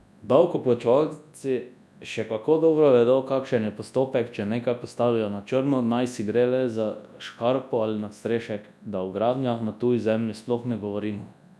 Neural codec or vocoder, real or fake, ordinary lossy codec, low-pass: codec, 24 kHz, 0.9 kbps, WavTokenizer, large speech release; fake; none; none